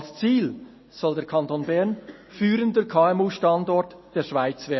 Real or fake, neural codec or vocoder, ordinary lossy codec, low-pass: real; none; MP3, 24 kbps; 7.2 kHz